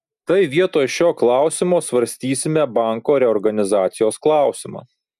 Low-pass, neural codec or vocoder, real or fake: 14.4 kHz; none; real